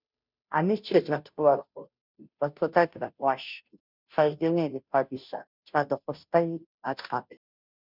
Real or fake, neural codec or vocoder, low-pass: fake; codec, 16 kHz, 0.5 kbps, FunCodec, trained on Chinese and English, 25 frames a second; 5.4 kHz